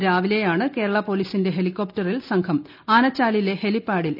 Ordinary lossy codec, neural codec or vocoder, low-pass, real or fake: none; none; 5.4 kHz; real